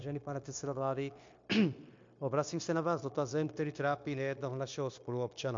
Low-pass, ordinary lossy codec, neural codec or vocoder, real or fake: 7.2 kHz; MP3, 48 kbps; codec, 16 kHz, 0.9 kbps, LongCat-Audio-Codec; fake